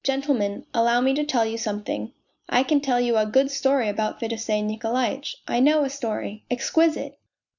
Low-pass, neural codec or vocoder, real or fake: 7.2 kHz; none; real